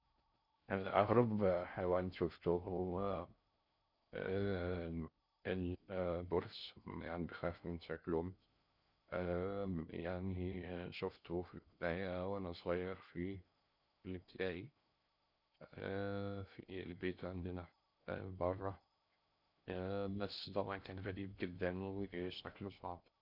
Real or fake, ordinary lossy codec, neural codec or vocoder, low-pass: fake; none; codec, 16 kHz in and 24 kHz out, 0.6 kbps, FocalCodec, streaming, 4096 codes; 5.4 kHz